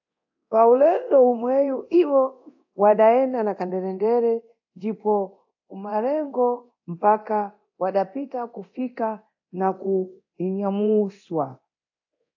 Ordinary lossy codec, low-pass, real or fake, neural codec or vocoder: AAC, 48 kbps; 7.2 kHz; fake; codec, 24 kHz, 0.9 kbps, DualCodec